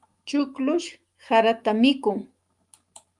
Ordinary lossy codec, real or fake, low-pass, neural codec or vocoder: Opus, 32 kbps; fake; 10.8 kHz; autoencoder, 48 kHz, 128 numbers a frame, DAC-VAE, trained on Japanese speech